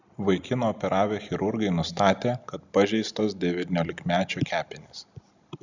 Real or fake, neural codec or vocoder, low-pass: real; none; 7.2 kHz